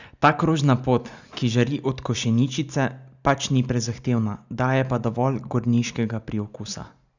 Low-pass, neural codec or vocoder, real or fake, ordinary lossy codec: 7.2 kHz; none; real; none